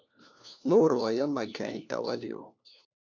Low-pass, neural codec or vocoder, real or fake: 7.2 kHz; codec, 16 kHz, 1 kbps, FunCodec, trained on LibriTTS, 50 frames a second; fake